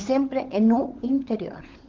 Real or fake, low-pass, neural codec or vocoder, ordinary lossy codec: fake; 7.2 kHz; codec, 16 kHz, 16 kbps, FunCodec, trained on LibriTTS, 50 frames a second; Opus, 16 kbps